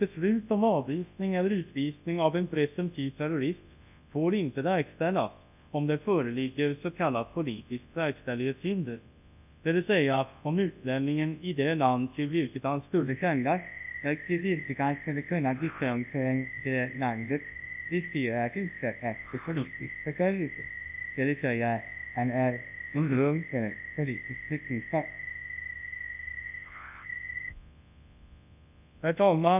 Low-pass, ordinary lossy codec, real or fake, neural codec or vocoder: 3.6 kHz; none; fake; codec, 24 kHz, 0.9 kbps, WavTokenizer, large speech release